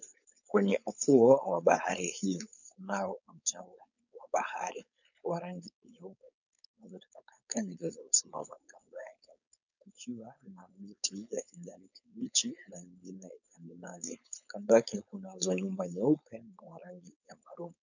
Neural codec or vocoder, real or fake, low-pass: codec, 16 kHz, 4.8 kbps, FACodec; fake; 7.2 kHz